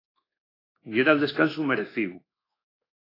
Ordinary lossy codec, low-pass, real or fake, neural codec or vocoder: AAC, 24 kbps; 5.4 kHz; fake; codec, 24 kHz, 1.2 kbps, DualCodec